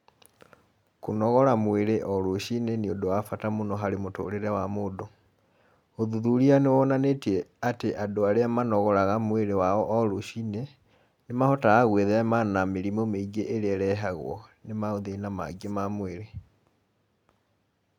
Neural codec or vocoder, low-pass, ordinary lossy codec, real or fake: none; 19.8 kHz; none; real